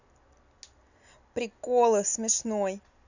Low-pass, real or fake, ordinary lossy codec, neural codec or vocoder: 7.2 kHz; real; none; none